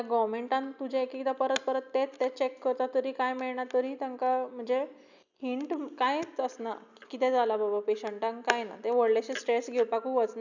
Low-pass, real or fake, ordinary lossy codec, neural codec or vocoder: 7.2 kHz; real; none; none